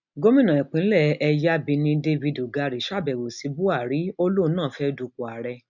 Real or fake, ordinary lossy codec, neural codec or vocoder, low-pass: real; none; none; 7.2 kHz